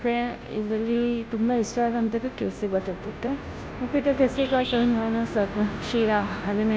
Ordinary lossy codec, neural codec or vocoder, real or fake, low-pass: none; codec, 16 kHz, 0.5 kbps, FunCodec, trained on Chinese and English, 25 frames a second; fake; none